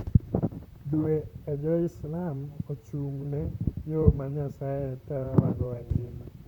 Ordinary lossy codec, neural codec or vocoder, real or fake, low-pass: none; vocoder, 44.1 kHz, 128 mel bands, Pupu-Vocoder; fake; 19.8 kHz